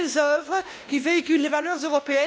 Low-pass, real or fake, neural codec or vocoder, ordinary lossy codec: none; fake; codec, 16 kHz, 1 kbps, X-Codec, WavLM features, trained on Multilingual LibriSpeech; none